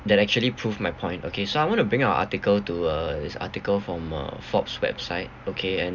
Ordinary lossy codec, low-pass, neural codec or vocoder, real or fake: none; 7.2 kHz; none; real